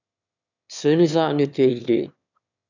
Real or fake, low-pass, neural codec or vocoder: fake; 7.2 kHz; autoencoder, 22.05 kHz, a latent of 192 numbers a frame, VITS, trained on one speaker